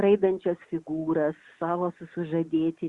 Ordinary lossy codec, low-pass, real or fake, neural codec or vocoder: Opus, 64 kbps; 10.8 kHz; real; none